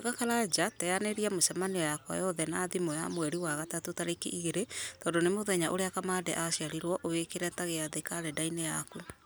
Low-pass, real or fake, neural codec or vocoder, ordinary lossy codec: none; real; none; none